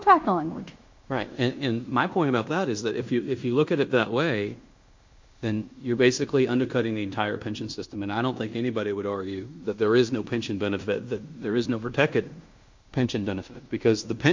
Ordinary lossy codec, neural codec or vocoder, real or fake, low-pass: MP3, 48 kbps; codec, 16 kHz in and 24 kHz out, 0.9 kbps, LongCat-Audio-Codec, fine tuned four codebook decoder; fake; 7.2 kHz